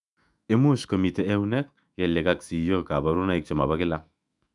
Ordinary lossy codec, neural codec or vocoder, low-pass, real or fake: none; autoencoder, 48 kHz, 128 numbers a frame, DAC-VAE, trained on Japanese speech; 10.8 kHz; fake